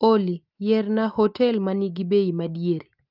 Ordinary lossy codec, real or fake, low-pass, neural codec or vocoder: Opus, 32 kbps; real; 5.4 kHz; none